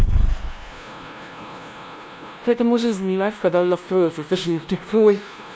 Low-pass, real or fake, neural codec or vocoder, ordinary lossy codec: none; fake; codec, 16 kHz, 0.5 kbps, FunCodec, trained on LibriTTS, 25 frames a second; none